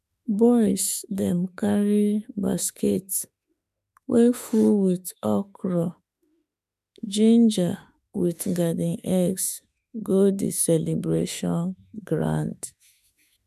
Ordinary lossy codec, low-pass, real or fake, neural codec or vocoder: none; 14.4 kHz; fake; autoencoder, 48 kHz, 32 numbers a frame, DAC-VAE, trained on Japanese speech